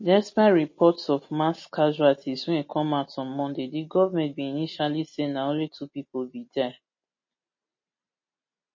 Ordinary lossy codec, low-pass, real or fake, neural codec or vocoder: MP3, 32 kbps; 7.2 kHz; real; none